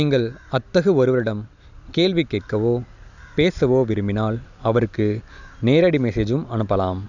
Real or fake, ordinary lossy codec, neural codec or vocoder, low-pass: real; none; none; 7.2 kHz